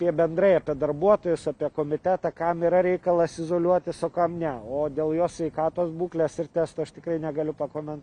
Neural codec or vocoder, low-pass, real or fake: none; 9.9 kHz; real